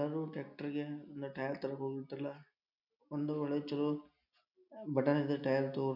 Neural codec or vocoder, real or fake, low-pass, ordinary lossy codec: none; real; 5.4 kHz; none